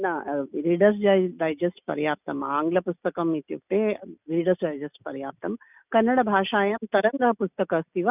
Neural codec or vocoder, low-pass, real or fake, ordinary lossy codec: none; 3.6 kHz; real; none